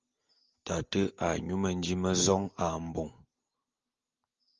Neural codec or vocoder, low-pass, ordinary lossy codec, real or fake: none; 7.2 kHz; Opus, 32 kbps; real